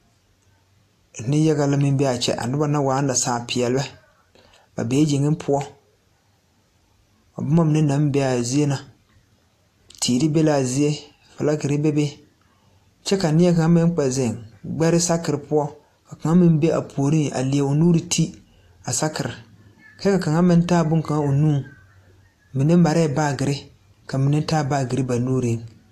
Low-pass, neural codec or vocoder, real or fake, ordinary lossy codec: 14.4 kHz; none; real; AAC, 64 kbps